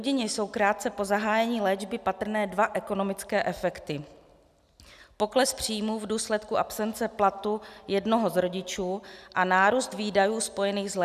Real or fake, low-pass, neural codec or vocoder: real; 14.4 kHz; none